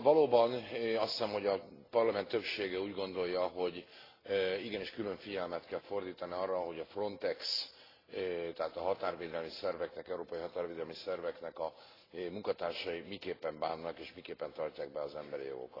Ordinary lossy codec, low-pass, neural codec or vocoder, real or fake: AAC, 24 kbps; 5.4 kHz; none; real